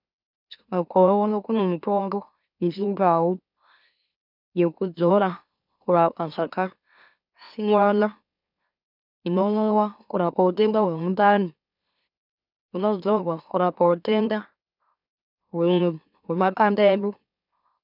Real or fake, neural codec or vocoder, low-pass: fake; autoencoder, 44.1 kHz, a latent of 192 numbers a frame, MeloTTS; 5.4 kHz